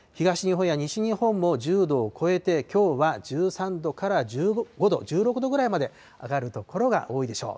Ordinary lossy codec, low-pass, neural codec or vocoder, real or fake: none; none; none; real